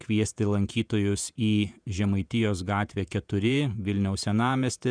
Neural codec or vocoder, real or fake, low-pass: vocoder, 48 kHz, 128 mel bands, Vocos; fake; 9.9 kHz